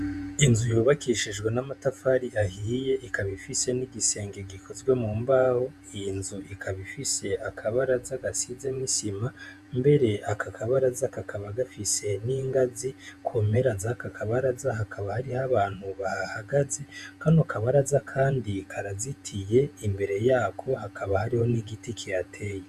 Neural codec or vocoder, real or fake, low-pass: vocoder, 48 kHz, 128 mel bands, Vocos; fake; 14.4 kHz